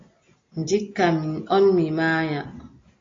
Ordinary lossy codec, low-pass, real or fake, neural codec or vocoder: AAC, 32 kbps; 7.2 kHz; real; none